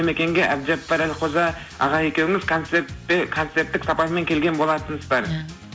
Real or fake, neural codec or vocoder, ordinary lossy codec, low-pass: real; none; none; none